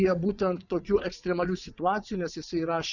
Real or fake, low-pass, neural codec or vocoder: fake; 7.2 kHz; vocoder, 44.1 kHz, 80 mel bands, Vocos